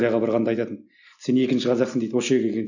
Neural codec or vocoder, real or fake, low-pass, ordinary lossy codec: none; real; 7.2 kHz; none